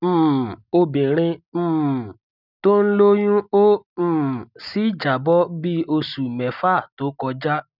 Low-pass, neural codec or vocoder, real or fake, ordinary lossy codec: 5.4 kHz; none; real; none